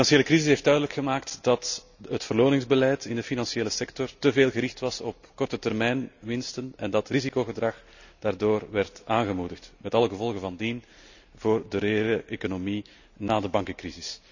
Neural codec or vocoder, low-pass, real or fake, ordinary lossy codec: none; 7.2 kHz; real; none